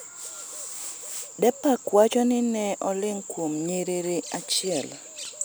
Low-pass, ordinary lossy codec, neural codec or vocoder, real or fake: none; none; none; real